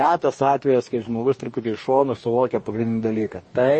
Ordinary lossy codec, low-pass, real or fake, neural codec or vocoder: MP3, 32 kbps; 9.9 kHz; fake; codec, 44.1 kHz, 2.6 kbps, DAC